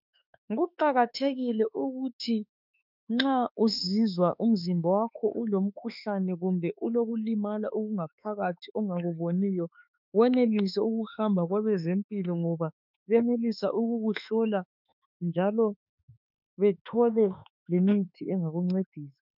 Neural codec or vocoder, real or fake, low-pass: autoencoder, 48 kHz, 32 numbers a frame, DAC-VAE, trained on Japanese speech; fake; 5.4 kHz